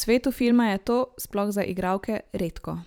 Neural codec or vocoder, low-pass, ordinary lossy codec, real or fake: vocoder, 44.1 kHz, 128 mel bands every 512 samples, BigVGAN v2; none; none; fake